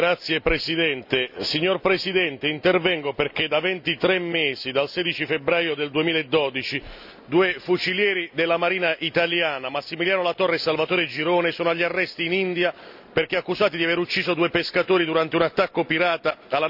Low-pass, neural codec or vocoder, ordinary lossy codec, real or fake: 5.4 kHz; none; none; real